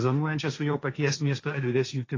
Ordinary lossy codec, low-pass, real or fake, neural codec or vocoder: AAC, 32 kbps; 7.2 kHz; fake; codec, 16 kHz, 1.1 kbps, Voila-Tokenizer